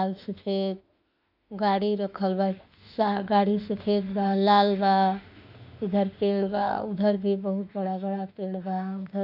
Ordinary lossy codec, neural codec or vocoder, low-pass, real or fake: none; autoencoder, 48 kHz, 32 numbers a frame, DAC-VAE, trained on Japanese speech; 5.4 kHz; fake